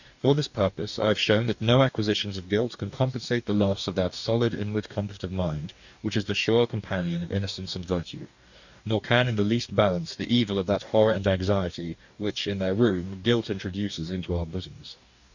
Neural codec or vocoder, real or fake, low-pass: codec, 44.1 kHz, 2.6 kbps, DAC; fake; 7.2 kHz